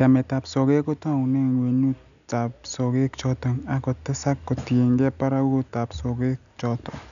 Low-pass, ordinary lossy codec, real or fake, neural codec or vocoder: 7.2 kHz; none; real; none